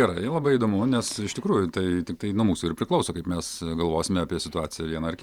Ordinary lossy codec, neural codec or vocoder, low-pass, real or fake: Opus, 64 kbps; vocoder, 48 kHz, 128 mel bands, Vocos; 19.8 kHz; fake